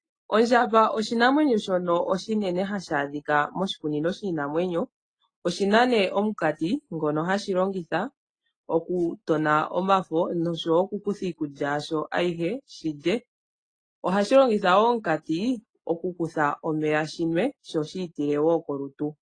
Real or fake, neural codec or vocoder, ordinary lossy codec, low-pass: real; none; AAC, 32 kbps; 9.9 kHz